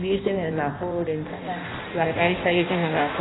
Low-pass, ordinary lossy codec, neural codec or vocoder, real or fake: 7.2 kHz; AAC, 16 kbps; codec, 16 kHz in and 24 kHz out, 0.6 kbps, FireRedTTS-2 codec; fake